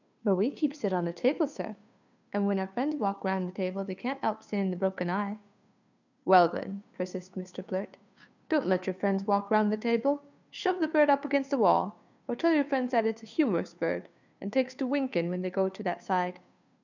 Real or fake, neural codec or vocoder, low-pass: fake; codec, 16 kHz, 2 kbps, FunCodec, trained on Chinese and English, 25 frames a second; 7.2 kHz